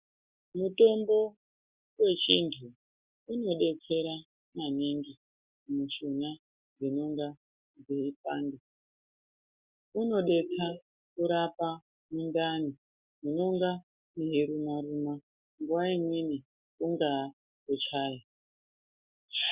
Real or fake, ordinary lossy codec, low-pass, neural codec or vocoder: real; Opus, 64 kbps; 3.6 kHz; none